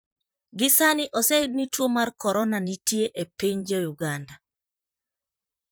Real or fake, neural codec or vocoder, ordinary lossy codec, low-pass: fake; vocoder, 44.1 kHz, 128 mel bands, Pupu-Vocoder; none; none